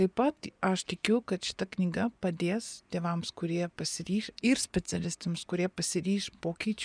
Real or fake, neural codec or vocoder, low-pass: fake; vocoder, 24 kHz, 100 mel bands, Vocos; 9.9 kHz